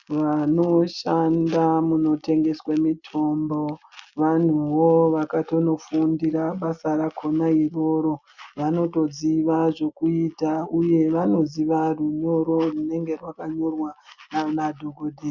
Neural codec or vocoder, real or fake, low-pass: none; real; 7.2 kHz